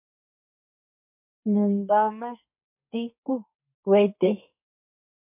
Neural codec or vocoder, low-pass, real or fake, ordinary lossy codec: codec, 32 kHz, 1.9 kbps, SNAC; 3.6 kHz; fake; MP3, 32 kbps